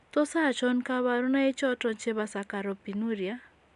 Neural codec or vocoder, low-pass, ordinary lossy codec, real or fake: none; 10.8 kHz; none; real